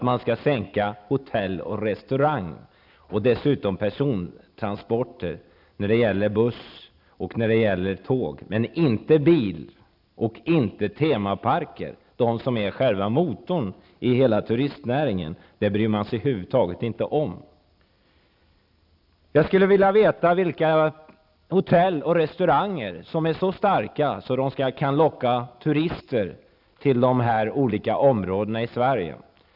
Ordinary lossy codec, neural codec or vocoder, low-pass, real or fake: none; none; 5.4 kHz; real